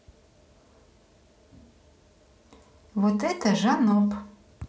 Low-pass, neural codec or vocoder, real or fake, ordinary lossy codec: none; none; real; none